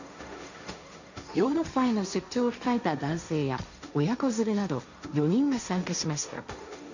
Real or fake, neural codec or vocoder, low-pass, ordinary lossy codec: fake; codec, 16 kHz, 1.1 kbps, Voila-Tokenizer; 7.2 kHz; none